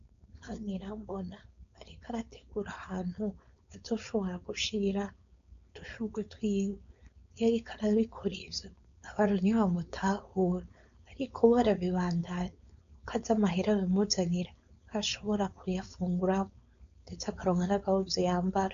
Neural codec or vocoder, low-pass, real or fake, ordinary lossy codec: codec, 16 kHz, 4.8 kbps, FACodec; 7.2 kHz; fake; AAC, 96 kbps